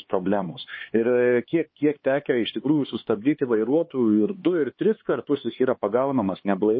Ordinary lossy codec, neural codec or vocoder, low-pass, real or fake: MP3, 24 kbps; codec, 16 kHz, 4 kbps, X-Codec, HuBERT features, trained on LibriSpeech; 7.2 kHz; fake